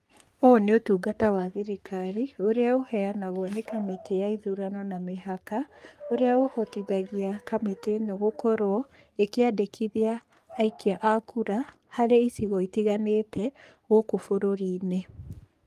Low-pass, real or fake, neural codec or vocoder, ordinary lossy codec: 14.4 kHz; fake; codec, 44.1 kHz, 3.4 kbps, Pupu-Codec; Opus, 32 kbps